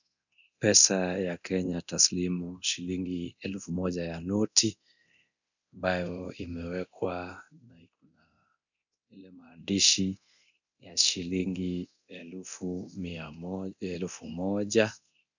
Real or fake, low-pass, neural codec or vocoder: fake; 7.2 kHz; codec, 24 kHz, 0.9 kbps, DualCodec